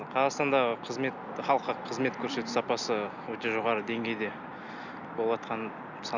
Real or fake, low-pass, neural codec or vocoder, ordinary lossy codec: real; 7.2 kHz; none; Opus, 64 kbps